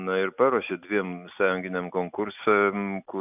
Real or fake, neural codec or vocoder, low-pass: real; none; 3.6 kHz